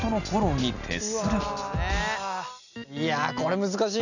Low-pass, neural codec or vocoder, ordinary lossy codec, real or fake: 7.2 kHz; none; none; real